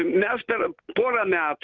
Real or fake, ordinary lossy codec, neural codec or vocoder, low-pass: real; Opus, 24 kbps; none; 7.2 kHz